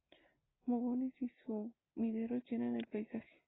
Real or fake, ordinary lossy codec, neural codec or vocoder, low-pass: real; AAC, 16 kbps; none; 7.2 kHz